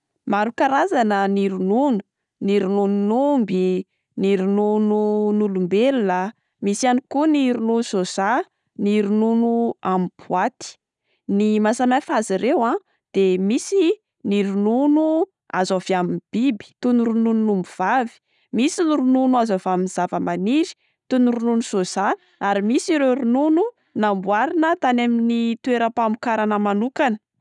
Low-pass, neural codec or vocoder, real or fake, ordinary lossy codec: 10.8 kHz; none; real; none